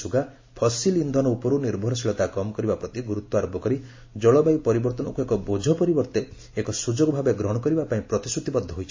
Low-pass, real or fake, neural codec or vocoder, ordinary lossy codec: 7.2 kHz; real; none; MP3, 32 kbps